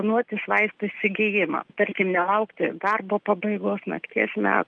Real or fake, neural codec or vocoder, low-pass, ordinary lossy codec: real; none; 9.9 kHz; Opus, 32 kbps